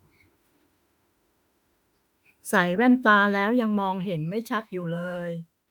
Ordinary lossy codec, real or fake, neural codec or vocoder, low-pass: none; fake; autoencoder, 48 kHz, 32 numbers a frame, DAC-VAE, trained on Japanese speech; 19.8 kHz